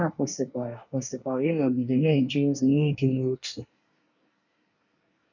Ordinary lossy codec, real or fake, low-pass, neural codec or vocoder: none; fake; 7.2 kHz; codec, 24 kHz, 1 kbps, SNAC